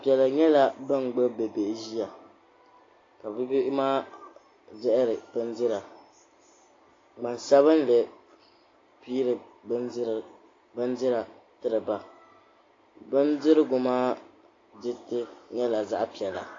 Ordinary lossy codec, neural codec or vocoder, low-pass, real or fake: AAC, 32 kbps; none; 7.2 kHz; real